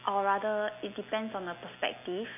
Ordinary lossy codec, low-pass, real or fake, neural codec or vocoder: none; 3.6 kHz; real; none